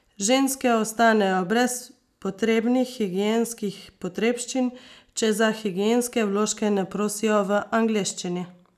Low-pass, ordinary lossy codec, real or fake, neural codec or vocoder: 14.4 kHz; none; real; none